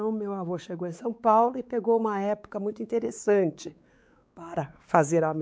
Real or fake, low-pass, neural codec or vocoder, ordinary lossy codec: fake; none; codec, 16 kHz, 4 kbps, X-Codec, WavLM features, trained on Multilingual LibriSpeech; none